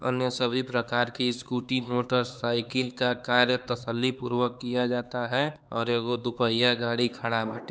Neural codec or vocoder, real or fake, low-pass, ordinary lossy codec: codec, 16 kHz, 4 kbps, X-Codec, HuBERT features, trained on LibriSpeech; fake; none; none